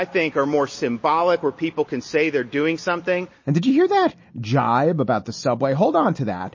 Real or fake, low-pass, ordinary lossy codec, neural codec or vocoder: real; 7.2 kHz; MP3, 32 kbps; none